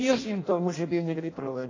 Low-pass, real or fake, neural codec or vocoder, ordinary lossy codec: 7.2 kHz; fake; codec, 16 kHz in and 24 kHz out, 0.6 kbps, FireRedTTS-2 codec; AAC, 32 kbps